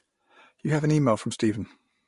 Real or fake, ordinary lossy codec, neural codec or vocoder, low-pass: real; MP3, 48 kbps; none; 10.8 kHz